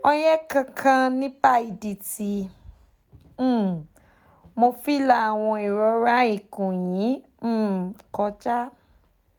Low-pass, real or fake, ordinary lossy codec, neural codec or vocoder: none; real; none; none